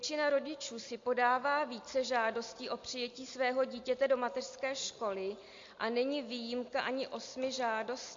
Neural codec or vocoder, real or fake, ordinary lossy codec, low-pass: none; real; MP3, 48 kbps; 7.2 kHz